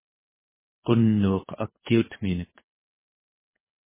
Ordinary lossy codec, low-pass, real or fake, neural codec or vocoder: MP3, 16 kbps; 3.6 kHz; fake; codec, 24 kHz, 3 kbps, HILCodec